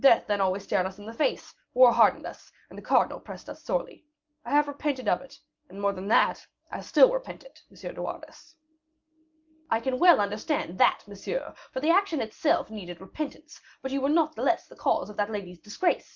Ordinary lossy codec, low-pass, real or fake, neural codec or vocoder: Opus, 24 kbps; 7.2 kHz; real; none